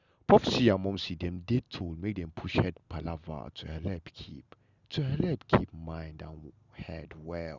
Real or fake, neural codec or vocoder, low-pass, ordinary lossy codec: real; none; 7.2 kHz; none